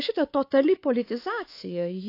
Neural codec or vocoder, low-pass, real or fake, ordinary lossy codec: codec, 24 kHz, 3.1 kbps, DualCodec; 5.4 kHz; fake; AAC, 32 kbps